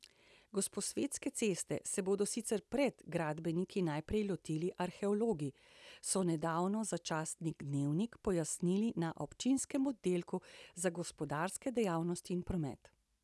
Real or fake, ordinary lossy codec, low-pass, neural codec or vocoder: real; none; none; none